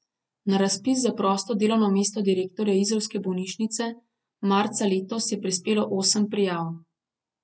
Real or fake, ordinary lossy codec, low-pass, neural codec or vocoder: real; none; none; none